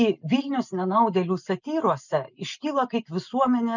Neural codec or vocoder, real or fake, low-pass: none; real; 7.2 kHz